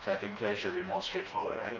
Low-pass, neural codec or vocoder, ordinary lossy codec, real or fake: 7.2 kHz; codec, 16 kHz, 1 kbps, FreqCodec, smaller model; AAC, 32 kbps; fake